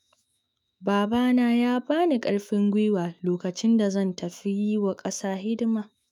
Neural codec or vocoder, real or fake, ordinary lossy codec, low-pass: autoencoder, 48 kHz, 128 numbers a frame, DAC-VAE, trained on Japanese speech; fake; none; 19.8 kHz